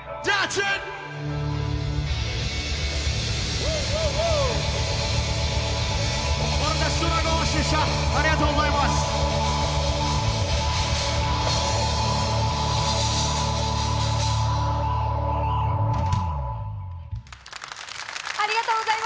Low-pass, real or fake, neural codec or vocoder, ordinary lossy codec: none; real; none; none